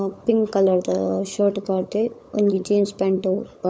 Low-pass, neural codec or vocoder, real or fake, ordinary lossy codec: none; codec, 16 kHz, 16 kbps, FunCodec, trained on LibriTTS, 50 frames a second; fake; none